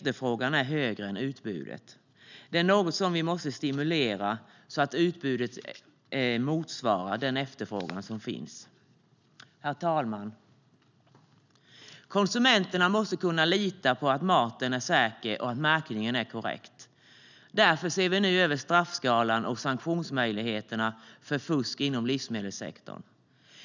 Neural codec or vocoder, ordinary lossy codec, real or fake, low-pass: none; none; real; 7.2 kHz